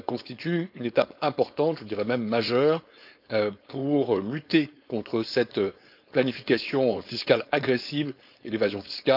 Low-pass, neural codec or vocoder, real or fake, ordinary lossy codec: 5.4 kHz; codec, 16 kHz, 4.8 kbps, FACodec; fake; none